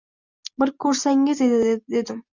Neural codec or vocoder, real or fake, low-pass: none; real; 7.2 kHz